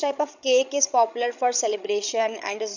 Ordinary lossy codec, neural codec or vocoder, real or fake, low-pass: none; codec, 16 kHz, 16 kbps, FreqCodec, larger model; fake; 7.2 kHz